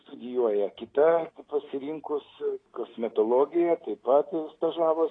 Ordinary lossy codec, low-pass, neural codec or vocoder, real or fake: AAC, 32 kbps; 9.9 kHz; none; real